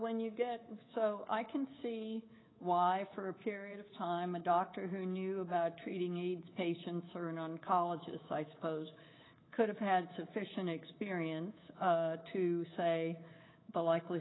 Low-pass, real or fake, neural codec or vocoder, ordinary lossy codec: 7.2 kHz; fake; codec, 24 kHz, 3.1 kbps, DualCodec; AAC, 16 kbps